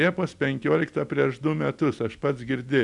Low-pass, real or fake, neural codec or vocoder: 10.8 kHz; real; none